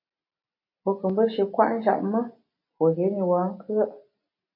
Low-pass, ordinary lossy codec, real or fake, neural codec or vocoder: 5.4 kHz; MP3, 32 kbps; real; none